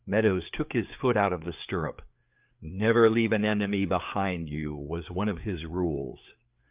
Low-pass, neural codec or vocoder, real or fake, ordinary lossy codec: 3.6 kHz; codec, 16 kHz, 4 kbps, FreqCodec, larger model; fake; Opus, 24 kbps